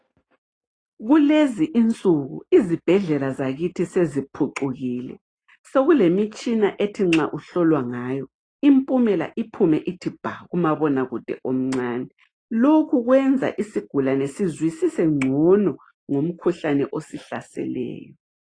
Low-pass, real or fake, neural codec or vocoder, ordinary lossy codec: 9.9 kHz; real; none; AAC, 32 kbps